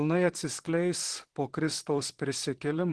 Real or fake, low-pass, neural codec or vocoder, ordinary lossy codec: fake; 10.8 kHz; vocoder, 44.1 kHz, 128 mel bands, Pupu-Vocoder; Opus, 16 kbps